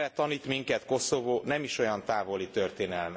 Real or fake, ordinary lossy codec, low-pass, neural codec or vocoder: real; none; none; none